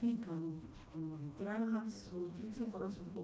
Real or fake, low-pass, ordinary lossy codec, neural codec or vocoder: fake; none; none; codec, 16 kHz, 1 kbps, FreqCodec, smaller model